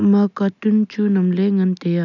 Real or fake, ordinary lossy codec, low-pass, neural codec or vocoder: real; none; 7.2 kHz; none